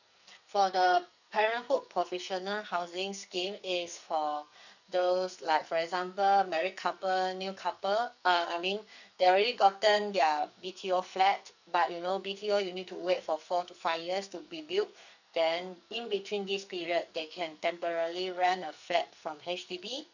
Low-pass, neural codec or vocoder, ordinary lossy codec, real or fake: 7.2 kHz; codec, 44.1 kHz, 2.6 kbps, SNAC; none; fake